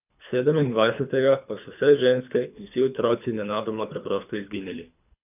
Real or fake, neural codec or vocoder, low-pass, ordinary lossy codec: fake; codec, 24 kHz, 3 kbps, HILCodec; 3.6 kHz; none